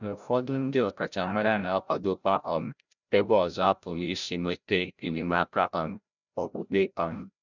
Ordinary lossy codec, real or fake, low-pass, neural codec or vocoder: none; fake; 7.2 kHz; codec, 16 kHz, 0.5 kbps, FreqCodec, larger model